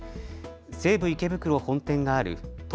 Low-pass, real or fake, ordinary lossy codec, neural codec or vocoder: none; real; none; none